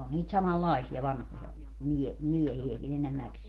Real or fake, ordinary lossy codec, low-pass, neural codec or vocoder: fake; Opus, 16 kbps; 19.8 kHz; autoencoder, 48 kHz, 128 numbers a frame, DAC-VAE, trained on Japanese speech